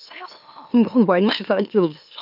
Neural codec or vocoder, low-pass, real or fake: autoencoder, 44.1 kHz, a latent of 192 numbers a frame, MeloTTS; 5.4 kHz; fake